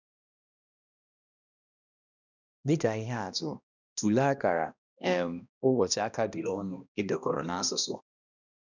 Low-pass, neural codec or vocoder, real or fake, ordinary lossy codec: 7.2 kHz; codec, 16 kHz, 1 kbps, X-Codec, HuBERT features, trained on balanced general audio; fake; none